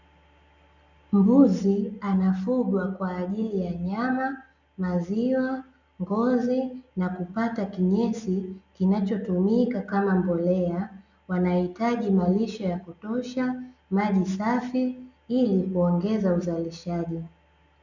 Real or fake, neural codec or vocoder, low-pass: real; none; 7.2 kHz